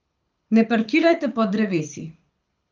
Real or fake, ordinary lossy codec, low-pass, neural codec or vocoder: fake; Opus, 32 kbps; 7.2 kHz; codec, 24 kHz, 6 kbps, HILCodec